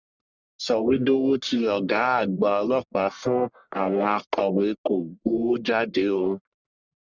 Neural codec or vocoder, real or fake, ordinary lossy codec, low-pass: codec, 44.1 kHz, 1.7 kbps, Pupu-Codec; fake; Opus, 64 kbps; 7.2 kHz